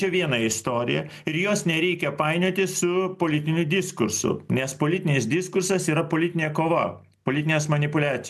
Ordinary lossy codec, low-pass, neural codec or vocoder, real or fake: MP3, 96 kbps; 14.4 kHz; none; real